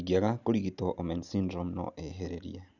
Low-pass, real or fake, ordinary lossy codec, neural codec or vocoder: 7.2 kHz; real; none; none